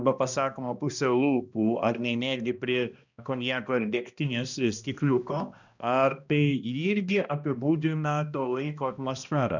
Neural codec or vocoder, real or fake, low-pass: codec, 16 kHz, 1 kbps, X-Codec, HuBERT features, trained on balanced general audio; fake; 7.2 kHz